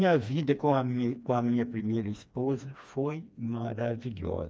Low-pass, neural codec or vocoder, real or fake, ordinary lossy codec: none; codec, 16 kHz, 2 kbps, FreqCodec, smaller model; fake; none